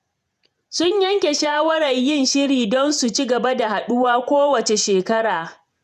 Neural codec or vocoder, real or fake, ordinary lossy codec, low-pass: vocoder, 48 kHz, 128 mel bands, Vocos; fake; none; 14.4 kHz